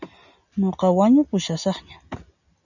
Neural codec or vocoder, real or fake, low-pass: none; real; 7.2 kHz